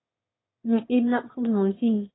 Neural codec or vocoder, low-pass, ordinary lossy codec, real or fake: autoencoder, 22.05 kHz, a latent of 192 numbers a frame, VITS, trained on one speaker; 7.2 kHz; AAC, 16 kbps; fake